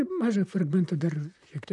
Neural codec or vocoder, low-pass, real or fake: vocoder, 44.1 kHz, 128 mel bands, Pupu-Vocoder; 10.8 kHz; fake